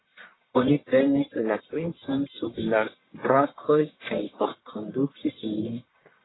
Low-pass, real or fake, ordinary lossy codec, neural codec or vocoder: 7.2 kHz; fake; AAC, 16 kbps; codec, 44.1 kHz, 1.7 kbps, Pupu-Codec